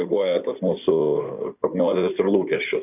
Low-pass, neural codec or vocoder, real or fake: 3.6 kHz; codec, 16 kHz in and 24 kHz out, 2.2 kbps, FireRedTTS-2 codec; fake